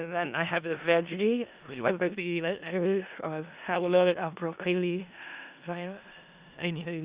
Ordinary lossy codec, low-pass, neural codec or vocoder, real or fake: Opus, 64 kbps; 3.6 kHz; codec, 16 kHz in and 24 kHz out, 0.4 kbps, LongCat-Audio-Codec, four codebook decoder; fake